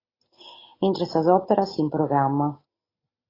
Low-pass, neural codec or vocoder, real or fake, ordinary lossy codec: 5.4 kHz; none; real; AAC, 24 kbps